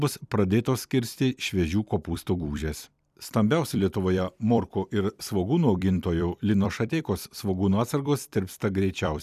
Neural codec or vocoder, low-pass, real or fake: vocoder, 44.1 kHz, 128 mel bands every 256 samples, BigVGAN v2; 14.4 kHz; fake